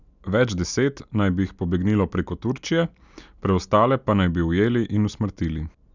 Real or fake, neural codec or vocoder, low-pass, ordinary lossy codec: real; none; 7.2 kHz; none